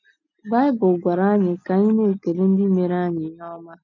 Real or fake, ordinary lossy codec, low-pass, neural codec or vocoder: real; none; 7.2 kHz; none